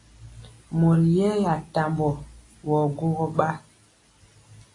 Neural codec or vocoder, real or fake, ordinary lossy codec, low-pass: none; real; AAC, 32 kbps; 10.8 kHz